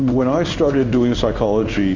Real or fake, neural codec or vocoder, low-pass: fake; codec, 16 kHz in and 24 kHz out, 1 kbps, XY-Tokenizer; 7.2 kHz